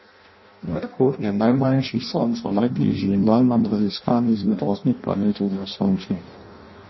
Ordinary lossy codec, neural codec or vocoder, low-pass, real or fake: MP3, 24 kbps; codec, 16 kHz in and 24 kHz out, 0.6 kbps, FireRedTTS-2 codec; 7.2 kHz; fake